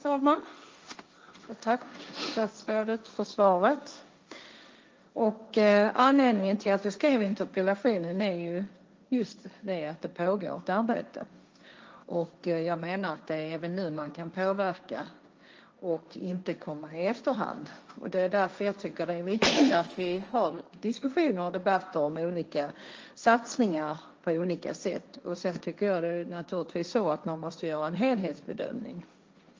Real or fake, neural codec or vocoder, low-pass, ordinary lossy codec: fake; codec, 16 kHz, 1.1 kbps, Voila-Tokenizer; 7.2 kHz; Opus, 24 kbps